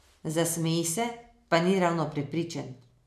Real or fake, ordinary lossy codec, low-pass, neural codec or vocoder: real; none; 14.4 kHz; none